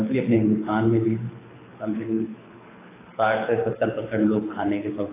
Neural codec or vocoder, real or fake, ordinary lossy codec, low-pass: codec, 24 kHz, 6 kbps, HILCodec; fake; AAC, 16 kbps; 3.6 kHz